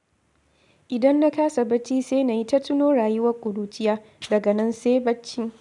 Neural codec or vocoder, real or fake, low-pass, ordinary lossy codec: none; real; 10.8 kHz; none